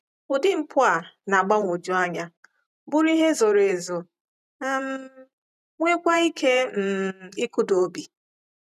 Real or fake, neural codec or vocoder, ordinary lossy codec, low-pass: fake; vocoder, 44.1 kHz, 128 mel bands every 512 samples, BigVGAN v2; none; 14.4 kHz